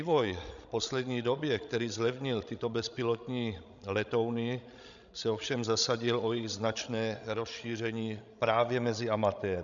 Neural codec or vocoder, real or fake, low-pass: codec, 16 kHz, 16 kbps, FreqCodec, larger model; fake; 7.2 kHz